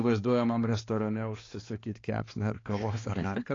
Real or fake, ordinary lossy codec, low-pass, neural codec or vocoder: fake; AAC, 32 kbps; 7.2 kHz; codec, 16 kHz, 2 kbps, X-Codec, HuBERT features, trained on balanced general audio